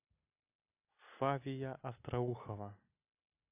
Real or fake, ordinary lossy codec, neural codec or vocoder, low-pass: real; AAC, 24 kbps; none; 3.6 kHz